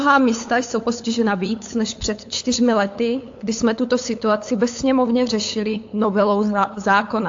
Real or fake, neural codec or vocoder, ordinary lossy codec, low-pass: fake; codec, 16 kHz, 8 kbps, FunCodec, trained on LibriTTS, 25 frames a second; AAC, 48 kbps; 7.2 kHz